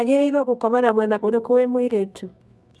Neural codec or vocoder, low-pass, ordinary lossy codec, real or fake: codec, 24 kHz, 0.9 kbps, WavTokenizer, medium music audio release; none; none; fake